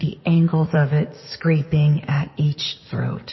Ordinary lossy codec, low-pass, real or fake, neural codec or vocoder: MP3, 24 kbps; 7.2 kHz; fake; codec, 16 kHz in and 24 kHz out, 2.2 kbps, FireRedTTS-2 codec